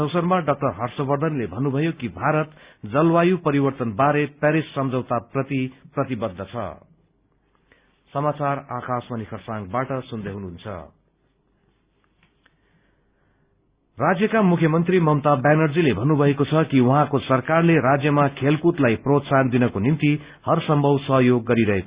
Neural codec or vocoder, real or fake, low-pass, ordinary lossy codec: none; real; 3.6 kHz; Opus, 64 kbps